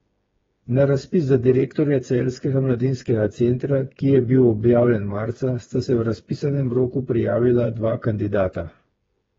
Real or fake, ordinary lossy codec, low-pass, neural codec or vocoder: fake; AAC, 24 kbps; 7.2 kHz; codec, 16 kHz, 4 kbps, FreqCodec, smaller model